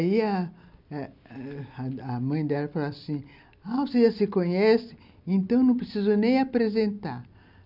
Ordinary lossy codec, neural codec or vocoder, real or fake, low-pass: none; none; real; 5.4 kHz